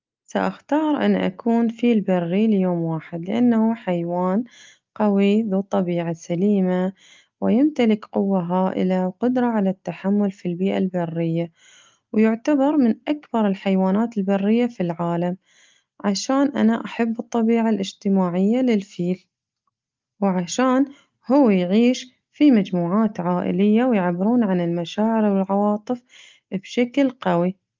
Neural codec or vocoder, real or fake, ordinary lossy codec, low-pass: none; real; Opus, 32 kbps; 7.2 kHz